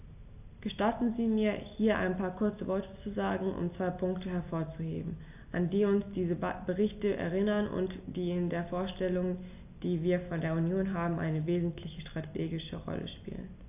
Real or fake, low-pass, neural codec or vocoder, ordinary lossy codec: real; 3.6 kHz; none; AAC, 32 kbps